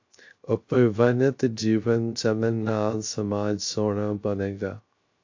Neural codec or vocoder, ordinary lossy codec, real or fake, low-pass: codec, 16 kHz, 0.3 kbps, FocalCodec; AAC, 48 kbps; fake; 7.2 kHz